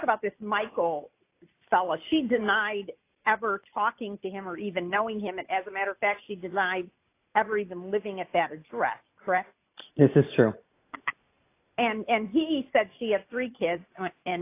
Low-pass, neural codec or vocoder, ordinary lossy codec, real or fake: 3.6 kHz; none; AAC, 24 kbps; real